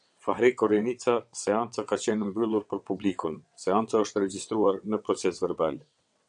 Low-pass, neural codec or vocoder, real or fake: 9.9 kHz; vocoder, 22.05 kHz, 80 mel bands, WaveNeXt; fake